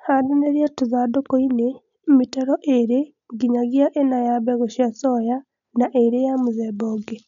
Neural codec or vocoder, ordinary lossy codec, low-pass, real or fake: none; none; 7.2 kHz; real